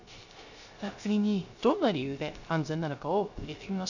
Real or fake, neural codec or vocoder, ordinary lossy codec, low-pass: fake; codec, 16 kHz, 0.3 kbps, FocalCodec; none; 7.2 kHz